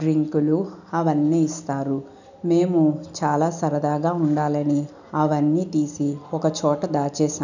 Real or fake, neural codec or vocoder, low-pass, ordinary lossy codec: real; none; 7.2 kHz; none